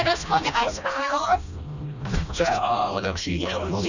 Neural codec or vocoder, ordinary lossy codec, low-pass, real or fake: codec, 16 kHz, 1 kbps, FreqCodec, smaller model; none; 7.2 kHz; fake